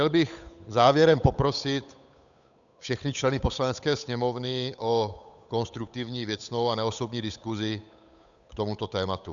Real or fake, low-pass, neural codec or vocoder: fake; 7.2 kHz; codec, 16 kHz, 8 kbps, FunCodec, trained on Chinese and English, 25 frames a second